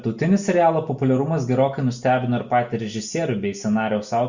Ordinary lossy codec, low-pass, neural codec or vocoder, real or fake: Opus, 64 kbps; 7.2 kHz; none; real